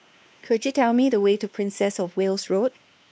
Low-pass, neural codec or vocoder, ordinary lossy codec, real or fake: none; codec, 16 kHz, 2 kbps, X-Codec, WavLM features, trained on Multilingual LibriSpeech; none; fake